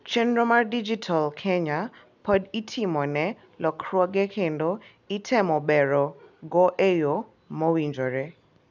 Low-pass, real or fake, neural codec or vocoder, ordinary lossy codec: 7.2 kHz; real; none; none